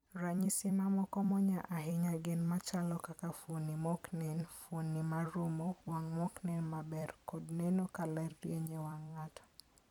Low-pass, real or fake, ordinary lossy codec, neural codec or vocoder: 19.8 kHz; fake; none; vocoder, 44.1 kHz, 128 mel bands every 256 samples, BigVGAN v2